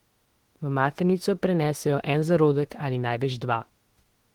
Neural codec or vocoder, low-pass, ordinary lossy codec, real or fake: autoencoder, 48 kHz, 32 numbers a frame, DAC-VAE, trained on Japanese speech; 19.8 kHz; Opus, 16 kbps; fake